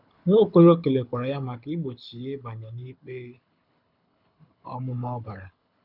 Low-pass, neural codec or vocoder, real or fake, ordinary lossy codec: 5.4 kHz; codec, 24 kHz, 6 kbps, HILCodec; fake; none